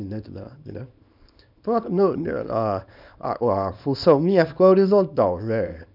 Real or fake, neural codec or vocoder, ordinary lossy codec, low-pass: fake; codec, 24 kHz, 0.9 kbps, WavTokenizer, small release; none; 5.4 kHz